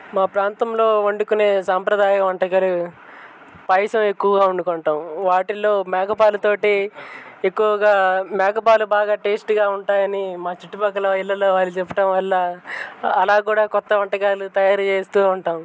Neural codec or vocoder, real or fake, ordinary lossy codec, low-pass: none; real; none; none